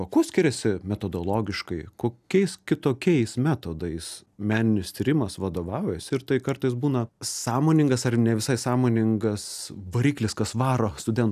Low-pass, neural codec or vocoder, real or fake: 14.4 kHz; none; real